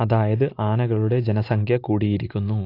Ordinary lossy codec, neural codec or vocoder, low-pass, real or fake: AAC, 32 kbps; none; 5.4 kHz; real